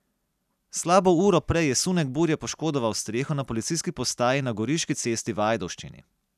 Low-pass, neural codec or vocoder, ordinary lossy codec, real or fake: 14.4 kHz; none; none; real